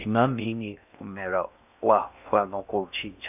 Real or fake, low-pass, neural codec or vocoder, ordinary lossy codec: fake; 3.6 kHz; codec, 16 kHz in and 24 kHz out, 0.6 kbps, FocalCodec, streaming, 4096 codes; none